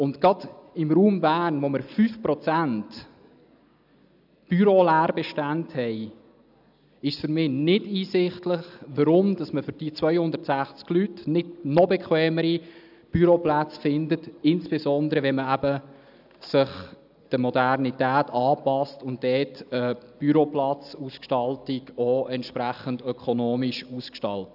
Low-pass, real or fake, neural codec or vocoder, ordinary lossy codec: 5.4 kHz; real; none; none